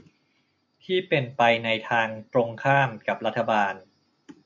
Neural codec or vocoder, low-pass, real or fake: none; 7.2 kHz; real